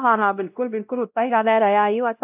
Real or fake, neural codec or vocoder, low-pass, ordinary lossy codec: fake; codec, 16 kHz, 0.5 kbps, X-Codec, WavLM features, trained on Multilingual LibriSpeech; 3.6 kHz; AAC, 32 kbps